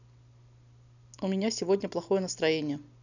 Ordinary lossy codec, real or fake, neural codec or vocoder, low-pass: none; real; none; 7.2 kHz